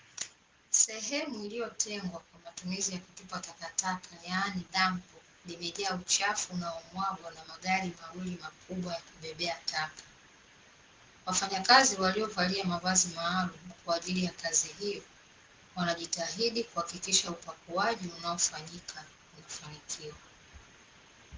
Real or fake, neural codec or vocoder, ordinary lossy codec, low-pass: real; none; Opus, 16 kbps; 7.2 kHz